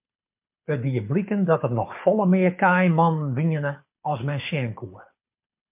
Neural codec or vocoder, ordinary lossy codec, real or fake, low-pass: codec, 24 kHz, 6 kbps, HILCodec; MP3, 32 kbps; fake; 3.6 kHz